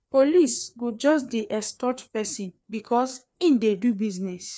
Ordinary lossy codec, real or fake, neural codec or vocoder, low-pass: none; fake; codec, 16 kHz, 2 kbps, FreqCodec, larger model; none